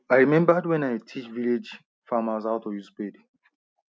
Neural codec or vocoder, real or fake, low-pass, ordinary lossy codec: none; real; none; none